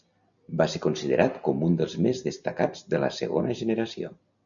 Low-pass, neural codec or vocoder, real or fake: 7.2 kHz; none; real